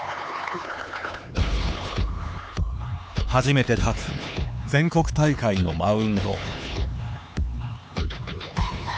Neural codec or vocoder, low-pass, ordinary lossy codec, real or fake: codec, 16 kHz, 4 kbps, X-Codec, HuBERT features, trained on LibriSpeech; none; none; fake